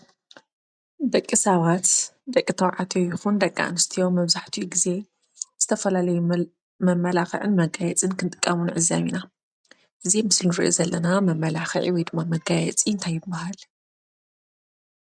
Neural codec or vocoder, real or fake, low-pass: none; real; 9.9 kHz